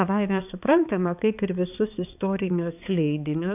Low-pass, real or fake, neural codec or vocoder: 3.6 kHz; fake; codec, 16 kHz, 4 kbps, X-Codec, HuBERT features, trained on balanced general audio